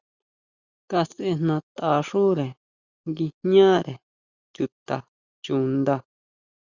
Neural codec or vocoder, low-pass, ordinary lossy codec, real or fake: none; 7.2 kHz; Opus, 64 kbps; real